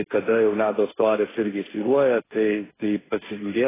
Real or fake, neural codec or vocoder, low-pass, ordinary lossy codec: fake; codec, 16 kHz, 1.1 kbps, Voila-Tokenizer; 3.6 kHz; AAC, 16 kbps